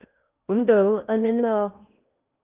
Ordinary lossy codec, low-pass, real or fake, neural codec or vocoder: Opus, 24 kbps; 3.6 kHz; fake; codec, 16 kHz in and 24 kHz out, 0.6 kbps, FocalCodec, streaming, 2048 codes